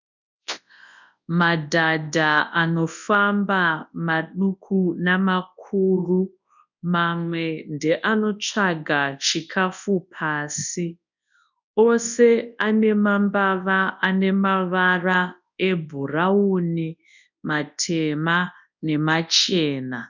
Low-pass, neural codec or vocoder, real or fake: 7.2 kHz; codec, 24 kHz, 0.9 kbps, WavTokenizer, large speech release; fake